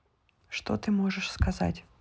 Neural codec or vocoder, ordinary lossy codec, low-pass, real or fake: none; none; none; real